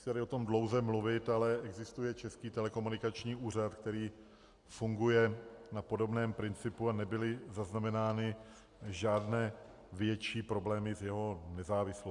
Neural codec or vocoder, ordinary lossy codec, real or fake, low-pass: none; Opus, 64 kbps; real; 10.8 kHz